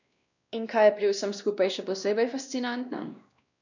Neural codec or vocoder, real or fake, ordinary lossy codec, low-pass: codec, 16 kHz, 1 kbps, X-Codec, WavLM features, trained on Multilingual LibriSpeech; fake; none; 7.2 kHz